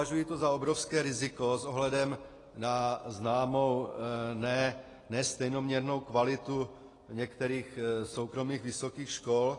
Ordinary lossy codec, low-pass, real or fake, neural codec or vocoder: AAC, 32 kbps; 10.8 kHz; real; none